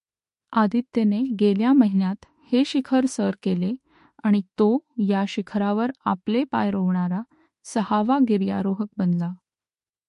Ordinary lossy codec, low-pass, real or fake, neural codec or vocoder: MP3, 48 kbps; 14.4 kHz; fake; autoencoder, 48 kHz, 32 numbers a frame, DAC-VAE, trained on Japanese speech